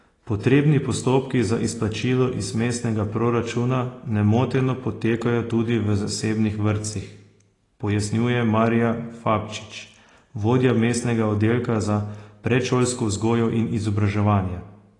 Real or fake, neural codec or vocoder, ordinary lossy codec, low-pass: real; none; AAC, 32 kbps; 10.8 kHz